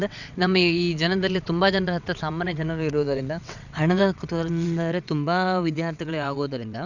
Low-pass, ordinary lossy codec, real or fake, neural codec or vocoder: 7.2 kHz; none; fake; vocoder, 22.05 kHz, 80 mel bands, WaveNeXt